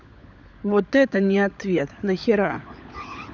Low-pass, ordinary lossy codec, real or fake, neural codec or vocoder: 7.2 kHz; none; fake; codec, 16 kHz, 16 kbps, FunCodec, trained on LibriTTS, 50 frames a second